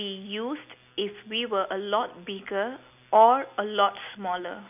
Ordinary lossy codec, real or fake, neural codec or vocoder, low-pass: none; real; none; 3.6 kHz